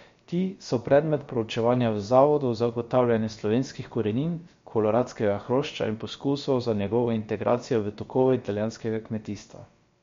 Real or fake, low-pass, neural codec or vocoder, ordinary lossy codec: fake; 7.2 kHz; codec, 16 kHz, about 1 kbps, DyCAST, with the encoder's durations; MP3, 48 kbps